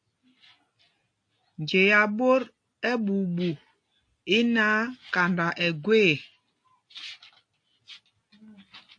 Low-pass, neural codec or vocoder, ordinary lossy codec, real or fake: 9.9 kHz; none; AAC, 48 kbps; real